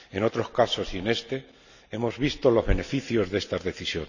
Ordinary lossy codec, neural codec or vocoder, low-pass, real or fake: none; none; 7.2 kHz; real